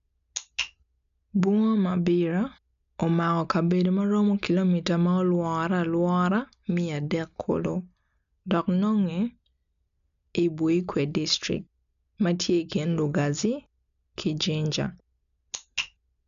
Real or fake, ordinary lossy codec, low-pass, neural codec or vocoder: real; none; 7.2 kHz; none